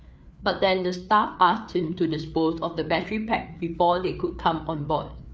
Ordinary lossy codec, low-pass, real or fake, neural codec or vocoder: none; none; fake; codec, 16 kHz, 4 kbps, FreqCodec, larger model